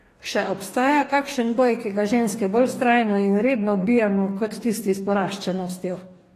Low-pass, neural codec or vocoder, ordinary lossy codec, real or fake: 14.4 kHz; codec, 44.1 kHz, 2.6 kbps, DAC; AAC, 48 kbps; fake